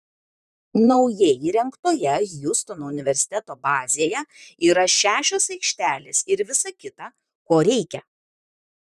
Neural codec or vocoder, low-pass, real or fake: vocoder, 44.1 kHz, 128 mel bands, Pupu-Vocoder; 14.4 kHz; fake